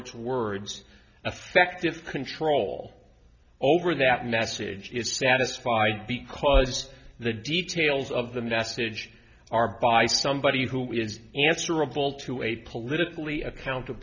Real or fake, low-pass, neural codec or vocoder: real; 7.2 kHz; none